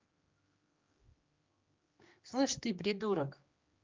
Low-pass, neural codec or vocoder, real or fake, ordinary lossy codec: 7.2 kHz; codec, 16 kHz, 2 kbps, X-Codec, HuBERT features, trained on general audio; fake; Opus, 24 kbps